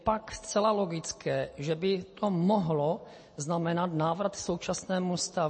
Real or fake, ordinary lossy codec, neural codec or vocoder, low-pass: real; MP3, 32 kbps; none; 9.9 kHz